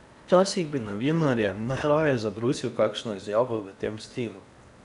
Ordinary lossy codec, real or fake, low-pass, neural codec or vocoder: none; fake; 10.8 kHz; codec, 16 kHz in and 24 kHz out, 0.8 kbps, FocalCodec, streaming, 65536 codes